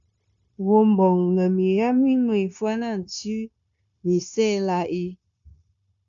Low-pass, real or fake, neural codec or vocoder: 7.2 kHz; fake; codec, 16 kHz, 0.9 kbps, LongCat-Audio-Codec